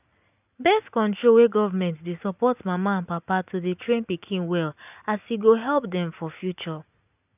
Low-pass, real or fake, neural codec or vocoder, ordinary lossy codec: 3.6 kHz; real; none; none